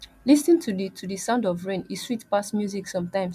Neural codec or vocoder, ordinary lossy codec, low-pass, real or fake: none; MP3, 96 kbps; 14.4 kHz; real